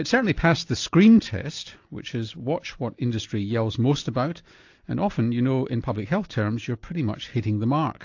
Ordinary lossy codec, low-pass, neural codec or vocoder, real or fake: AAC, 48 kbps; 7.2 kHz; none; real